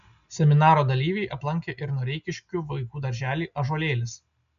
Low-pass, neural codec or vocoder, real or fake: 7.2 kHz; none; real